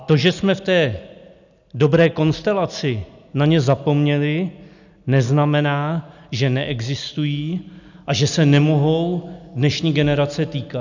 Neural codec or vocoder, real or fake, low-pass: none; real; 7.2 kHz